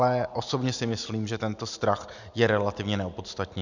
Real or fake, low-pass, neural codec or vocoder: real; 7.2 kHz; none